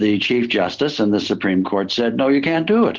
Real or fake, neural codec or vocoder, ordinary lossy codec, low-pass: real; none; Opus, 16 kbps; 7.2 kHz